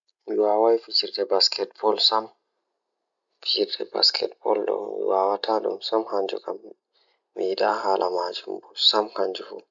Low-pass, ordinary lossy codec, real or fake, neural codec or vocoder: 7.2 kHz; none; real; none